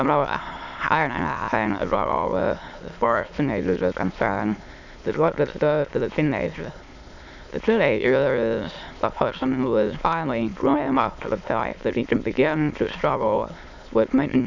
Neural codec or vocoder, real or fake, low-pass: autoencoder, 22.05 kHz, a latent of 192 numbers a frame, VITS, trained on many speakers; fake; 7.2 kHz